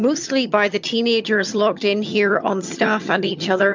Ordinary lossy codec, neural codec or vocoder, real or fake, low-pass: MP3, 64 kbps; vocoder, 22.05 kHz, 80 mel bands, HiFi-GAN; fake; 7.2 kHz